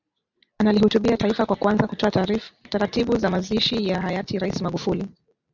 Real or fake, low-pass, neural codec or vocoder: real; 7.2 kHz; none